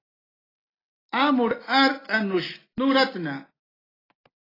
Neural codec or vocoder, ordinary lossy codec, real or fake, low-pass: none; AAC, 24 kbps; real; 5.4 kHz